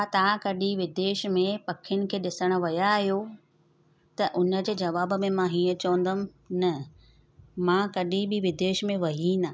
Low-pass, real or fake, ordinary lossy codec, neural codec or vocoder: none; real; none; none